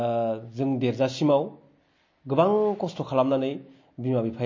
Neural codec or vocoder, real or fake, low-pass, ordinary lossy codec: none; real; 7.2 kHz; MP3, 32 kbps